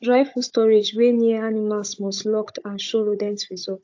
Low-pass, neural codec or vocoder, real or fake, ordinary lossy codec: 7.2 kHz; codec, 16 kHz, 16 kbps, FunCodec, trained on Chinese and English, 50 frames a second; fake; AAC, 48 kbps